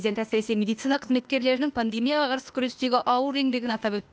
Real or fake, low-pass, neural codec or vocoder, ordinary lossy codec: fake; none; codec, 16 kHz, 0.8 kbps, ZipCodec; none